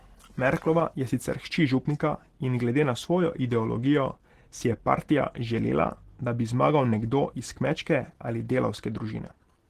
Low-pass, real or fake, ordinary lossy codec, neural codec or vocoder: 14.4 kHz; real; Opus, 16 kbps; none